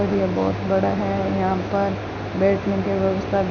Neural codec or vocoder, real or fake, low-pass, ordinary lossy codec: none; real; 7.2 kHz; none